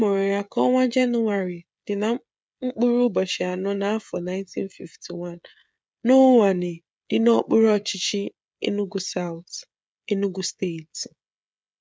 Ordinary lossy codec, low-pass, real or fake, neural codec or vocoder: none; none; fake; codec, 16 kHz, 16 kbps, FreqCodec, smaller model